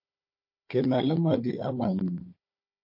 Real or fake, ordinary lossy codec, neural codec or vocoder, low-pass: fake; MP3, 32 kbps; codec, 16 kHz, 4 kbps, FunCodec, trained on Chinese and English, 50 frames a second; 5.4 kHz